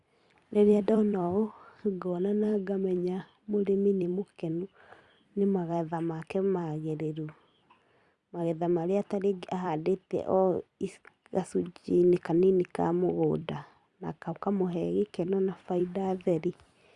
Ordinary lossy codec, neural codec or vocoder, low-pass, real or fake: Opus, 64 kbps; vocoder, 44.1 kHz, 128 mel bands every 256 samples, BigVGAN v2; 10.8 kHz; fake